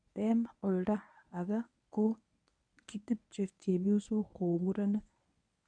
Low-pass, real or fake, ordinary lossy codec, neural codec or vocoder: 9.9 kHz; fake; none; codec, 24 kHz, 0.9 kbps, WavTokenizer, medium speech release version 1